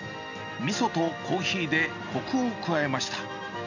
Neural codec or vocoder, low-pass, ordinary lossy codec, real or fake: none; 7.2 kHz; none; real